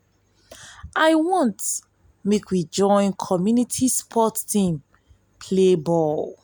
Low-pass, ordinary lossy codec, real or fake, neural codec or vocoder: none; none; real; none